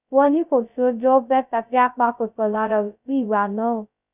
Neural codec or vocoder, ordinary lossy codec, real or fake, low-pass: codec, 16 kHz, 0.2 kbps, FocalCodec; none; fake; 3.6 kHz